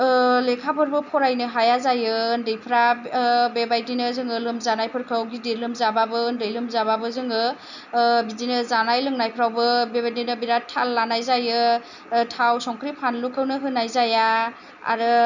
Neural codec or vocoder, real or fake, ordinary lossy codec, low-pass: none; real; none; 7.2 kHz